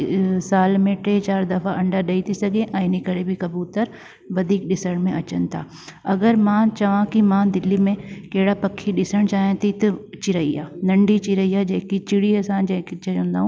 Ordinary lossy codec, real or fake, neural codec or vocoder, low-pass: none; real; none; none